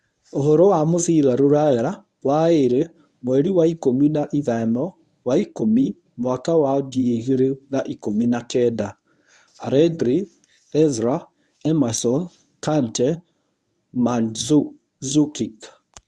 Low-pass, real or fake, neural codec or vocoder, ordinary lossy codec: none; fake; codec, 24 kHz, 0.9 kbps, WavTokenizer, medium speech release version 1; none